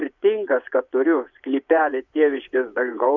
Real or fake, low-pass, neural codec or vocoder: fake; 7.2 kHz; vocoder, 24 kHz, 100 mel bands, Vocos